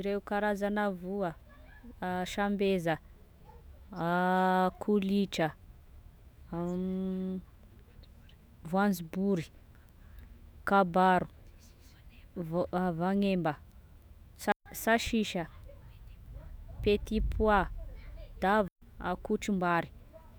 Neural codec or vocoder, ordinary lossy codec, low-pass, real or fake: autoencoder, 48 kHz, 128 numbers a frame, DAC-VAE, trained on Japanese speech; none; none; fake